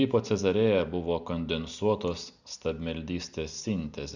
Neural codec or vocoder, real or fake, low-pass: none; real; 7.2 kHz